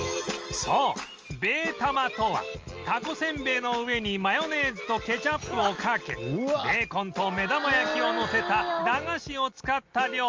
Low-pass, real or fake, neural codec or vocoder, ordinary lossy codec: 7.2 kHz; real; none; Opus, 24 kbps